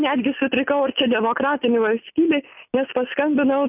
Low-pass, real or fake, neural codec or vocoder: 3.6 kHz; real; none